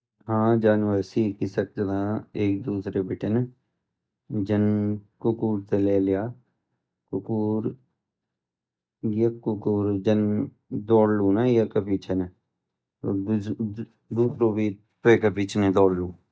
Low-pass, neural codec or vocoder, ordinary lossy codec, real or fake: none; none; none; real